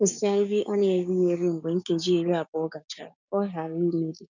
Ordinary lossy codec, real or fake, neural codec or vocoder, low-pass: none; fake; codec, 16 kHz, 6 kbps, DAC; 7.2 kHz